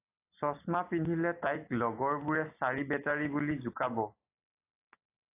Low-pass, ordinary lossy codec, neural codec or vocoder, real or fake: 3.6 kHz; AAC, 24 kbps; none; real